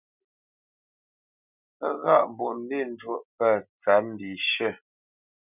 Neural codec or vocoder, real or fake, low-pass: none; real; 3.6 kHz